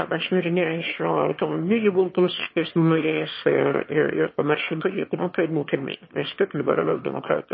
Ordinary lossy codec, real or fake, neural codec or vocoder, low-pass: MP3, 24 kbps; fake; autoencoder, 22.05 kHz, a latent of 192 numbers a frame, VITS, trained on one speaker; 7.2 kHz